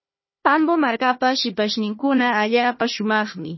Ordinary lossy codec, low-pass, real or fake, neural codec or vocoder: MP3, 24 kbps; 7.2 kHz; fake; codec, 16 kHz, 1 kbps, FunCodec, trained on Chinese and English, 50 frames a second